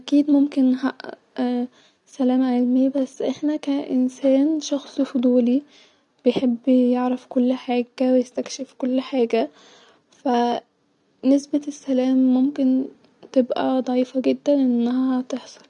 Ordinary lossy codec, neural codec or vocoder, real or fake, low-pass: none; none; real; 10.8 kHz